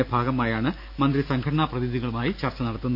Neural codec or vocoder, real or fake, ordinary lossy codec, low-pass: none; real; none; 5.4 kHz